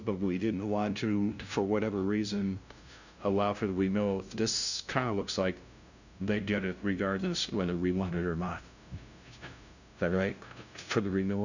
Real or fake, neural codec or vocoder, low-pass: fake; codec, 16 kHz, 0.5 kbps, FunCodec, trained on LibriTTS, 25 frames a second; 7.2 kHz